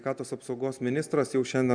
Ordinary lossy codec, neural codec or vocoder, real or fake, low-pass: MP3, 96 kbps; none; real; 9.9 kHz